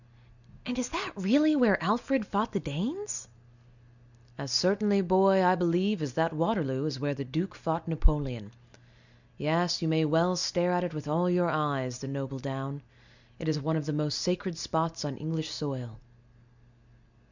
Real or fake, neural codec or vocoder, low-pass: real; none; 7.2 kHz